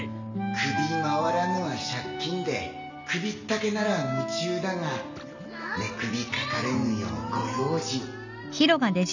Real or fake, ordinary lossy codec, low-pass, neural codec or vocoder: real; none; 7.2 kHz; none